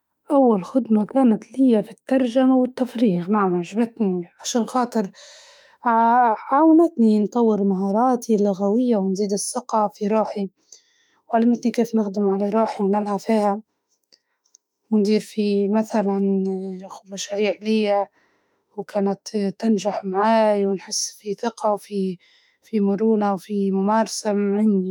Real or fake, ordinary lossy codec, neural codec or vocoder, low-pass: fake; none; autoencoder, 48 kHz, 32 numbers a frame, DAC-VAE, trained on Japanese speech; 19.8 kHz